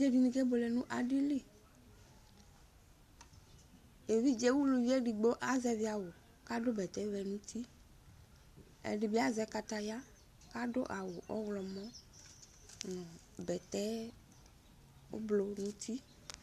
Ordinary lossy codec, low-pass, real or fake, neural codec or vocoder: Opus, 64 kbps; 14.4 kHz; real; none